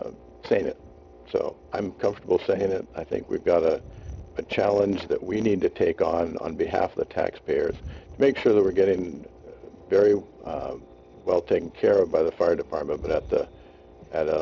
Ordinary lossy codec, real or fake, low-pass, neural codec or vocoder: Opus, 64 kbps; real; 7.2 kHz; none